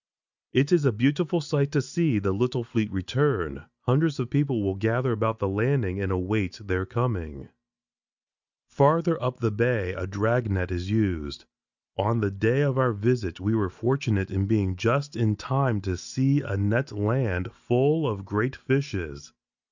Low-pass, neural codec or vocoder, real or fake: 7.2 kHz; none; real